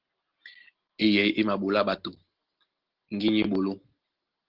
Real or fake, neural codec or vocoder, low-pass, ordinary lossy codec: real; none; 5.4 kHz; Opus, 16 kbps